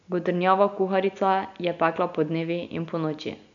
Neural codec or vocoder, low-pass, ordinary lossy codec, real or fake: none; 7.2 kHz; none; real